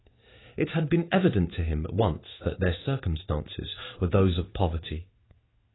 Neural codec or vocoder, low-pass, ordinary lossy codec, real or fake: codec, 16 kHz in and 24 kHz out, 1 kbps, XY-Tokenizer; 7.2 kHz; AAC, 16 kbps; fake